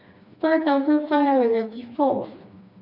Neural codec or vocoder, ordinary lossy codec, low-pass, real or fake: codec, 16 kHz, 2 kbps, FreqCodec, smaller model; none; 5.4 kHz; fake